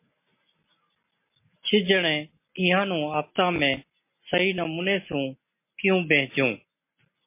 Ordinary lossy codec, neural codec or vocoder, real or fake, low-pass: MP3, 24 kbps; none; real; 3.6 kHz